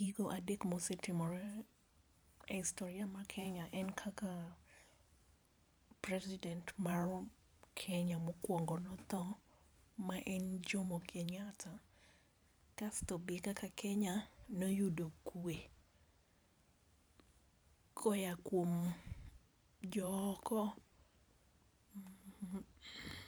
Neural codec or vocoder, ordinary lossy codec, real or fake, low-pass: vocoder, 44.1 kHz, 128 mel bands every 512 samples, BigVGAN v2; none; fake; none